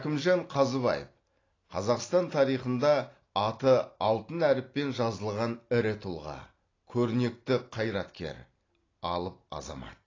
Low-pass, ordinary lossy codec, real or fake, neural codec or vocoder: 7.2 kHz; AAC, 32 kbps; real; none